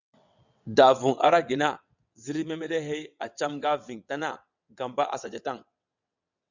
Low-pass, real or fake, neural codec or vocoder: 7.2 kHz; fake; vocoder, 22.05 kHz, 80 mel bands, WaveNeXt